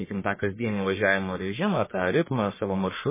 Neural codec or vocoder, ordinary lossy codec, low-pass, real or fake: codec, 44.1 kHz, 1.7 kbps, Pupu-Codec; MP3, 16 kbps; 3.6 kHz; fake